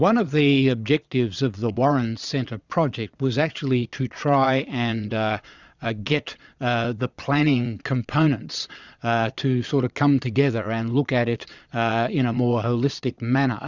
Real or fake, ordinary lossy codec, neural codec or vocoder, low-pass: fake; Opus, 64 kbps; vocoder, 22.05 kHz, 80 mel bands, Vocos; 7.2 kHz